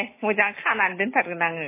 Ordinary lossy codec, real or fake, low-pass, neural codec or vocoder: MP3, 16 kbps; real; 3.6 kHz; none